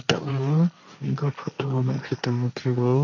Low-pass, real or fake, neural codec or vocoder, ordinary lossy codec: 7.2 kHz; fake; codec, 16 kHz, 1.1 kbps, Voila-Tokenizer; none